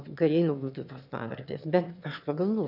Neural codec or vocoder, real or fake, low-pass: autoencoder, 22.05 kHz, a latent of 192 numbers a frame, VITS, trained on one speaker; fake; 5.4 kHz